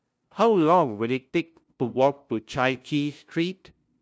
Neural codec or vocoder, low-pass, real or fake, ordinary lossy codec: codec, 16 kHz, 0.5 kbps, FunCodec, trained on LibriTTS, 25 frames a second; none; fake; none